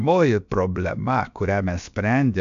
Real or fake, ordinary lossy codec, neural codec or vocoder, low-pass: fake; MP3, 64 kbps; codec, 16 kHz, about 1 kbps, DyCAST, with the encoder's durations; 7.2 kHz